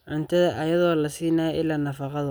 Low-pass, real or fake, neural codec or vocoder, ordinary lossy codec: none; real; none; none